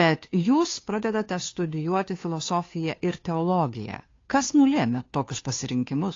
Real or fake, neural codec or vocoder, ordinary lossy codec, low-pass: fake; codec, 16 kHz, 2 kbps, FunCodec, trained on Chinese and English, 25 frames a second; AAC, 32 kbps; 7.2 kHz